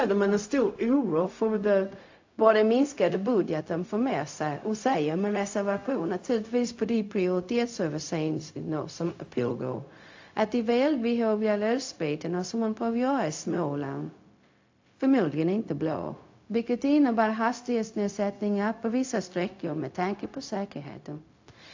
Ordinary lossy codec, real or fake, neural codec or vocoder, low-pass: AAC, 48 kbps; fake; codec, 16 kHz, 0.4 kbps, LongCat-Audio-Codec; 7.2 kHz